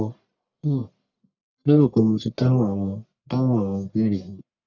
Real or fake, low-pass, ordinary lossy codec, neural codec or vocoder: fake; 7.2 kHz; none; codec, 44.1 kHz, 1.7 kbps, Pupu-Codec